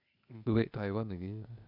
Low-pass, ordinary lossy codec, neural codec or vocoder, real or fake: 5.4 kHz; none; codec, 16 kHz, 0.8 kbps, ZipCodec; fake